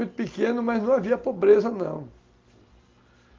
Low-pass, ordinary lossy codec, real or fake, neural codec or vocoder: 7.2 kHz; Opus, 32 kbps; real; none